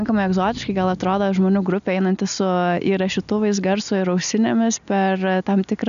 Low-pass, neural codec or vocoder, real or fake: 7.2 kHz; none; real